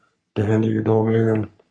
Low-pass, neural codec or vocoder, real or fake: 9.9 kHz; codec, 44.1 kHz, 3.4 kbps, Pupu-Codec; fake